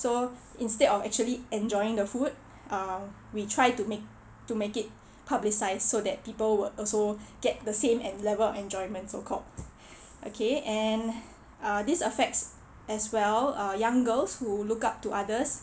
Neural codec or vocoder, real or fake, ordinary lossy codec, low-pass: none; real; none; none